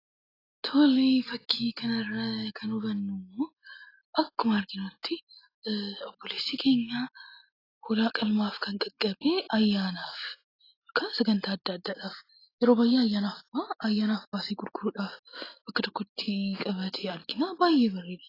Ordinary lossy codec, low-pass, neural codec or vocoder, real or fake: AAC, 24 kbps; 5.4 kHz; none; real